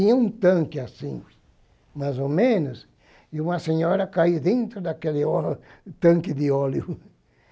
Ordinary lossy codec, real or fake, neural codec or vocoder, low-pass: none; real; none; none